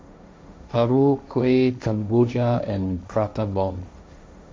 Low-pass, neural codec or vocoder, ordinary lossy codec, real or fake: none; codec, 16 kHz, 1.1 kbps, Voila-Tokenizer; none; fake